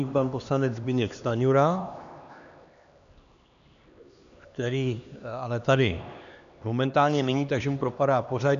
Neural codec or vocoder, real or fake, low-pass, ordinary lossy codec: codec, 16 kHz, 2 kbps, X-Codec, HuBERT features, trained on LibriSpeech; fake; 7.2 kHz; AAC, 96 kbps